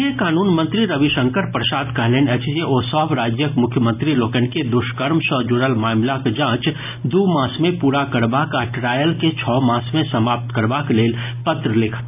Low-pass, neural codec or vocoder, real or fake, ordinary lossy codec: 3.6 kHz; none; real; AAC, 32 kbps